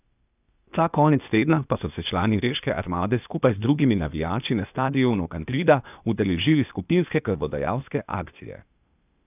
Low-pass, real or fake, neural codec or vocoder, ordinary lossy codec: 3.6 kHz; fake; codec, 16 kHz, 0.8 kbps, ZipCodec; none